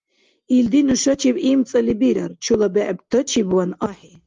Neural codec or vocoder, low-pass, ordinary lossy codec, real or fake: none; 7.2 kHz; Opus, 32 kbps; real